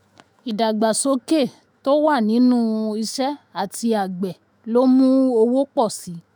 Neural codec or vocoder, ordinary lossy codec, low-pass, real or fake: autoencoder, 48 kHz, 128 numbers a frame, DAC-VAE, trained on Japanese speech; none; none; fake